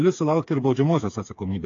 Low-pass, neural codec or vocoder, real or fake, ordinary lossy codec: 7.2 kHz; codec, 16 kHz, 4 kbps, FreqCodec, smaller model; fake; AAC, 48 kbps